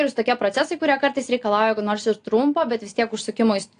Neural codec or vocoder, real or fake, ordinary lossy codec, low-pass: none; real; AAC, 48 kbps; 9.9 kHz